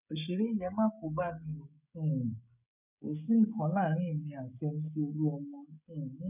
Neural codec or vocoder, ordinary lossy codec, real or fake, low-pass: codec, 16 kHz, 16 kbps, FreqCodec, smaller model; none; fake; 3.6 kHz